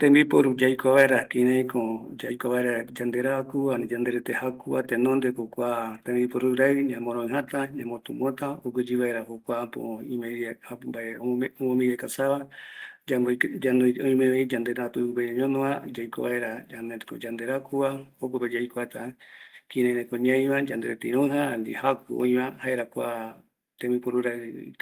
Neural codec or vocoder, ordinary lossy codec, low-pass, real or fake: none; Opus, 24 kbps; 14.4 kHz; real